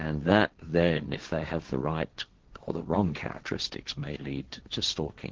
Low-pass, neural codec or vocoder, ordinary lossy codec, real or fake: 7.2 kHz; codec, 16 kHz, 1.1 kbps, Voila-Tokenizer; Opus, 16 kbps; fake